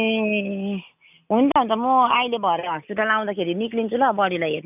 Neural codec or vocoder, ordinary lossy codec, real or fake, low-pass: none; none; real; 3.6 kHz